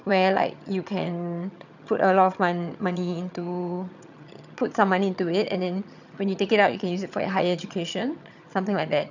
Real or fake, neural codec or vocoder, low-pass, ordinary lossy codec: fake; vocoder, 22.05 kHz, 80 mel bands, HiFi-GAN; 7.2 kHz; none